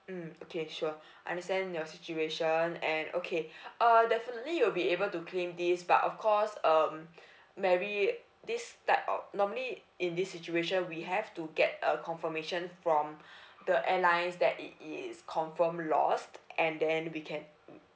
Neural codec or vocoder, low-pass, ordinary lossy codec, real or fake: none; none; none; real